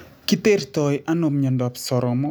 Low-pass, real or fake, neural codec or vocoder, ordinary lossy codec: none; real; none; none